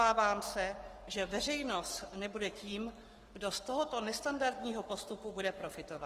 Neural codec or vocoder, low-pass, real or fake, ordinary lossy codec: codec, 44.1 kHz, 7.8 kbps, Pupu-Codec; 14.4 kHz; fake; Opus, 24 kbps